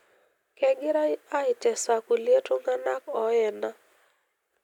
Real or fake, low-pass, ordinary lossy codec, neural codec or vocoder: real; 19.8 kHz; none; none